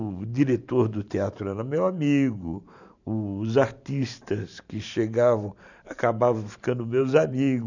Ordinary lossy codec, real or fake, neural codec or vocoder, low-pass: none; fake; vocoder, 44.1 kHz, 128 mel bands every 512 samples, BigVGAN v2; 7.2 kHz